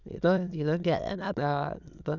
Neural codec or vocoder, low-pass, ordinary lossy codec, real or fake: autoencoder, 22.05 kHz, a latent of 192 numbers a frame, VITS, trained on many speakers; 7.2 kHz; none; fake